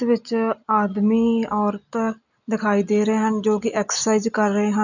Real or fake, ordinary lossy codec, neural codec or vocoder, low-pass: real; none; none; 7.2 kHz